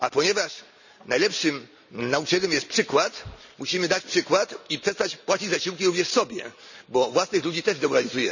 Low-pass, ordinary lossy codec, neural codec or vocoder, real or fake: 7.2 kHz; none; none; real